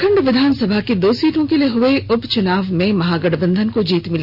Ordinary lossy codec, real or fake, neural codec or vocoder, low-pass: Opus, 64 kbps; real; none; 5.4 kHz